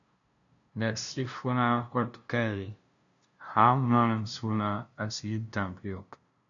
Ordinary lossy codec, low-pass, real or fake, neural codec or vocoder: MP3, 96 kbps; 7.2 kHz; fake; codec, 16 kHz, 0.5 kbps, FunCodec, trained on LibriTTS, 25 frames a second